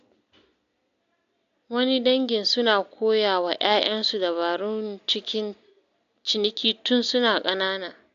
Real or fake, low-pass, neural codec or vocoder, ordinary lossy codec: real; 7.2 kHz; none; AAC, 64 kbps